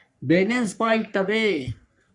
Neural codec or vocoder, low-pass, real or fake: codec, 44.1 kHz, 3.4 kbps, Pupu-Codec; 10.8 kHz; fake